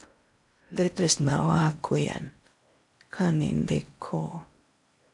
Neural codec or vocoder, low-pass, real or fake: codec, 16 kHz in and 24 kHz out, 0.6 kbps, FocalCodec, streaming, 4096 codes; 10.8 kHz; fake